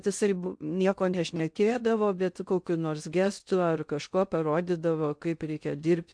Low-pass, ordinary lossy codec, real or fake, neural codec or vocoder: 9.9 kHz; MP3, 64 kbps; fake; codec, 16 kHz in and 24 kHz out, 0.6 kbps, FocalCodec, streaming, 2048 codes